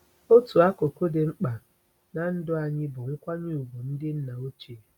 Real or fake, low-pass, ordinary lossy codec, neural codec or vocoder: real; 19.8 kHz; none; none